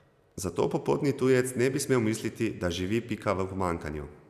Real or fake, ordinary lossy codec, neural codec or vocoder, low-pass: real; none; none; 14.4 kHz